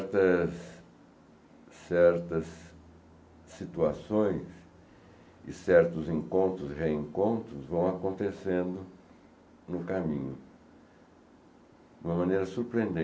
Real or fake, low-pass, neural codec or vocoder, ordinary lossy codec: real; none; none; none